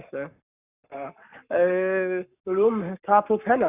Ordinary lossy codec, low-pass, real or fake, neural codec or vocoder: none; 3.6 kHz; fake; codec, 44.1 kHz, 7.8 kbps, Pupu-Codec